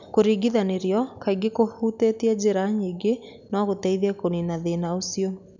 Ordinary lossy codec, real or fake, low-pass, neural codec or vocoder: none; real; 7.2 kHz; none